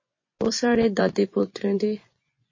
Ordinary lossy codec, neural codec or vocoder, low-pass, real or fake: MP3, 32 kbps; vocoder, 24 kHz, 100 mel bands, Vocos; 7.2 kHz; fake